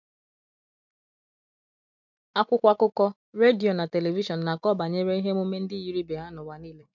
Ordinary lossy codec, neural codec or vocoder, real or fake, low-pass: none; none; real; 7.2 kHz